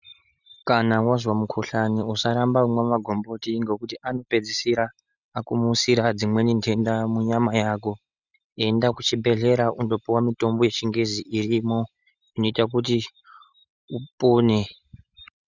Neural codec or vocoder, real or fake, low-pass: none; real; 7.2 kHz